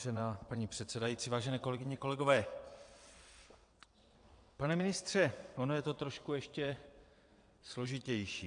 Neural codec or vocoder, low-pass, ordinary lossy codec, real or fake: vocoder, 22.05 kHz, 80 mel bands, WaveNeXt; 9.9 kHz; AAC, 64 kbps; fake